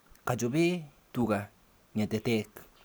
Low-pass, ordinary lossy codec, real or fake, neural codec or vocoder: none; none; real; none